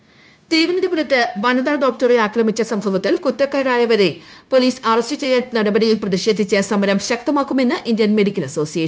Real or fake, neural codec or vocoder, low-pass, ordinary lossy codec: fake; codec, 16 kHz, 0.9 kbps, LongCat-Audio-Codec; none; none